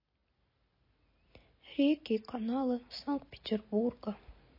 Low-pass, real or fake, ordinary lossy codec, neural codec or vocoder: 5.4 kHz; real; MP3, 24 kbps; none